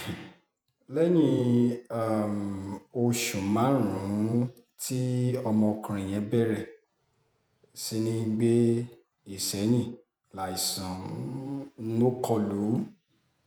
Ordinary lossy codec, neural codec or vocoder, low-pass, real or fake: none; vocoder, 48 kHz, 128 mel bands, Vocos; none; fake